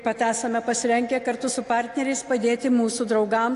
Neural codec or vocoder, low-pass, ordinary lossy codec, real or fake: none; 10.8 kHz; AAC, 48 kbps; real